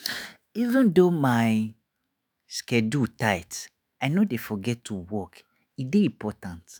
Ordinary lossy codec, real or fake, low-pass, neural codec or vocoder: none; fake; none; autoencoder, 48 kHz, 128 numbers a frame, DAC-VAE, trained on Japanese speech